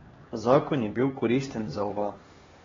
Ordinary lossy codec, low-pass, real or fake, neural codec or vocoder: AAC, 24 kbps; 7.2 kHz; fake; codec, 16 kHz, 2 kbps, X-Codec, HuBERT features, trained on LibriSpeech